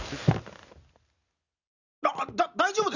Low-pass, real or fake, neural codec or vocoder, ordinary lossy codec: 7.2 kHz; real; none; none